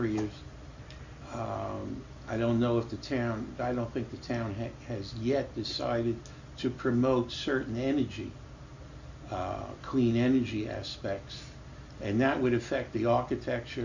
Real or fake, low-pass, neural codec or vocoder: real; 7.2 kHz; none